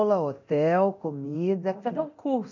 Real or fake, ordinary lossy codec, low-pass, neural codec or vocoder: fake; none; 7.2 kHz; codec, 24 kHz, 0.9 kbps, DualCodec